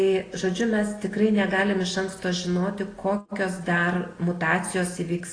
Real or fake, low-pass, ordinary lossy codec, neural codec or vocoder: real; 9.9 kHz; AAC, 32 kbps; none